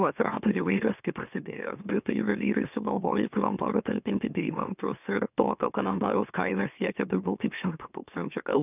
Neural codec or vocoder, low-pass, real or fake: autoencoder, 44.1 kHz, a latent of 192 numbers a frame, MeloTTS; 3.6 kHz; fake